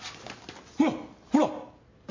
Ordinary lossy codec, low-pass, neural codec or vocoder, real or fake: MP3, 48 kbps; 7.2 kHz; none; real